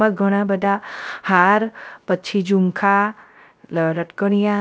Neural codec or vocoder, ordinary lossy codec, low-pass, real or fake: codec, 16 kHz, 0.3 kbps, FocalCodec; none; none; fake